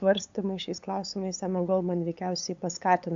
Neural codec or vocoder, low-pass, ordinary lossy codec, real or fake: codec, 16 kHz, 8 kbps, FunCodec, trained on LibriTTS, 25 frames a second; 7.2 kHz; AAC, 64 kbps; fake